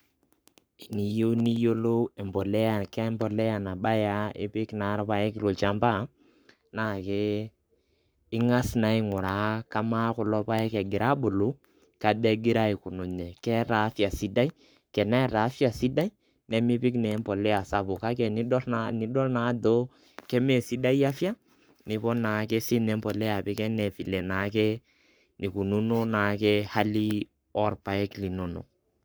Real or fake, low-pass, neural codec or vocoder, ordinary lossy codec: fake; none; codec, 44.1 kHz, 7.8 kbps, Pupu-Codec; none